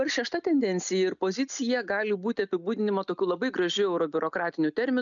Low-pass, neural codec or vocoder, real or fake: 7.2 kHz; none; real